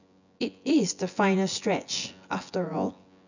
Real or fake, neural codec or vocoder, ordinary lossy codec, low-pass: fake; vocoder, 24 kHz, 100 mel bands, Vocos; none; 7.2 kHz